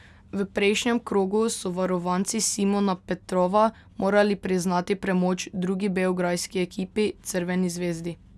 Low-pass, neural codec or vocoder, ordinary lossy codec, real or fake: none; none; none; real